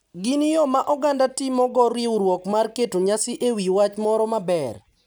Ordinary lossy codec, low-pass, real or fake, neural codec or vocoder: none; none; real; none